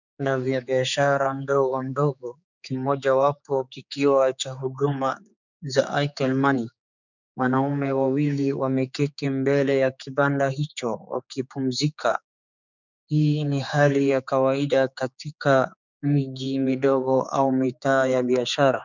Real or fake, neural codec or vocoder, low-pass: fake; codec, 16 kHz, 4 kbps, X-Codec, HuBERT features, trained on general audio; 7.2 kHz